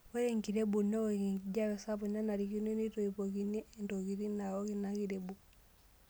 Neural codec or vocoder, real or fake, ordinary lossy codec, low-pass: none; real; none; none